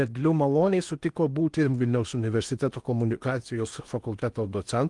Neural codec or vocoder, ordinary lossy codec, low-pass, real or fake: codec, 16 kHz in and 24 kHz out, 0.8 kbps, FocalCodec, streaming, 65536 codes; Opus, 32 kbps; 10.8 kHz; fake